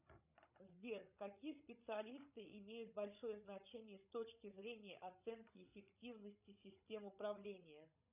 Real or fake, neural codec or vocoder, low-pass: fake; codec, 16 kHz, 16 kbps, FreqCodec, larger model; 3.6 kHz